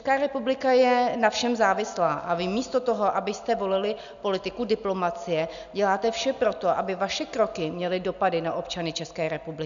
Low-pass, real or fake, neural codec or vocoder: 7.2 kHz; real; none